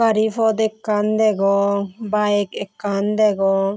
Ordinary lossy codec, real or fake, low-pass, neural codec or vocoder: none; real; none; none